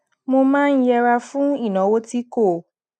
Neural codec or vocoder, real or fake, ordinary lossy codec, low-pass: none; real; none; none